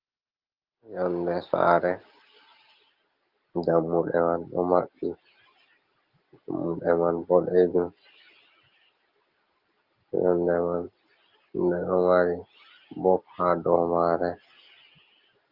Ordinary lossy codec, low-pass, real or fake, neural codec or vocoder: Opus, 24 kbps; 5.4 kHz; real; none